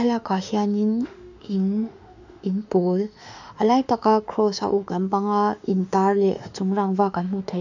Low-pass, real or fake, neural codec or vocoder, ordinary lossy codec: 7.2 kHz; fake; autoencoder, 48 kHz, 32 numbers a frame, DAC-VAE, trained on Japanese speech; none